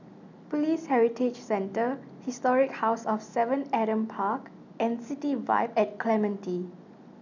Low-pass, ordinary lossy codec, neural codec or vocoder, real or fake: 7.2 kHz; none; none; real